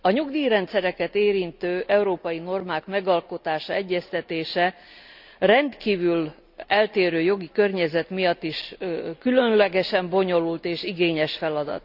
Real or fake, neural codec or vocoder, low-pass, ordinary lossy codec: real; none; 5.4 kHz; none